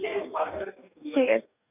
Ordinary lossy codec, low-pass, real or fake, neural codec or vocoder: none; 3.6 kHz; fake; codec, 44.1 kHz, 2.6 kbps, DAC